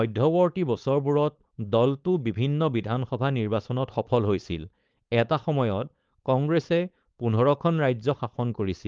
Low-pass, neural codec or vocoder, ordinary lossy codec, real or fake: 7.2 kHz; codec, 16 kHz, 4.8 kbps, FACodec; Opus, 32 kbps; fake